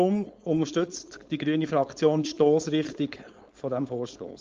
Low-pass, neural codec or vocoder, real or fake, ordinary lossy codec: 7.2 kHz; codec, 16 kHz, 4.8 kbps, FACodec; fake; Opus, 32 kbps